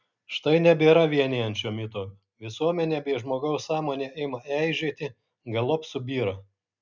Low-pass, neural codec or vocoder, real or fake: 7.2 kHz; none; real